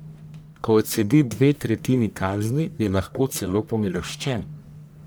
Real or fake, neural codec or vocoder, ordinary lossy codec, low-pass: fake; codec, 44.1 kHz, 1.7 kbps, Pupu-Codec; none; none